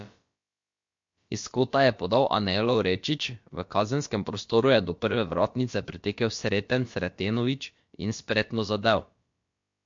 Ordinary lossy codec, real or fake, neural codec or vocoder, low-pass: MP3, 48 kbps; fake; codec, 16 kHz, about 1 kbps, DyCAST, with the encoder's durations; 7.2 kHz